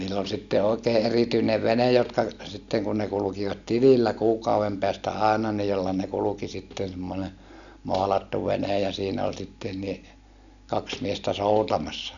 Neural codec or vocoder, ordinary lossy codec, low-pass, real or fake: none; none; 7.2 kHz; real